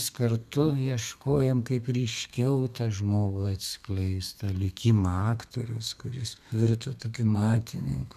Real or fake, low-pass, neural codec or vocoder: fake; 14.4 kHz; codec, 32 kHz, 1.9 kbps, SNAC